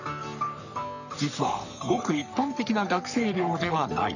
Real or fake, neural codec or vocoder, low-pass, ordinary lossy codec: fake; codec, 44.1 kHz, 3.4 kbps, Pupu-Codec; 7.2 kHz; none